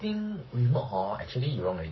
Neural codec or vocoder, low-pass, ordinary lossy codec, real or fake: codec, 44.1 kHz, 7.8 kbps, Pupu-Codec; 7.2 kHz; MP3, 24 kbps; fake